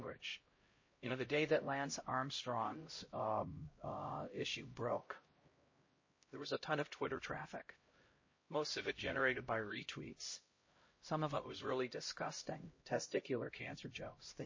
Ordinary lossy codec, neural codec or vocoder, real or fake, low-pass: MP3, 32 kbps; codec, 16 kHz, 0.5 kbps, X-Codec, HuBERT features, trained on LibriSpeech; fake; 7.2 kHz